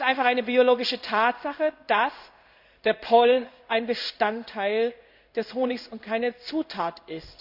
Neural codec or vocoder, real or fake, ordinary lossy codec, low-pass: codec, 16 kHz in and 24 kHz out, 1 kbps, XY-Tokenizer; fake; none; 5.4 kHz